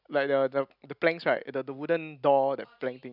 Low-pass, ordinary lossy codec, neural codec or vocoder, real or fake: 5.4 kHz; none; none; real